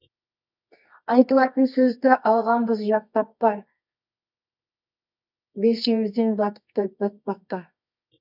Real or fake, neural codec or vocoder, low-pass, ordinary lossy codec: fake; codec, 24 kHz, 0.9 kbps, WavTokenizer, medium music audio release; 5.4 kHz; none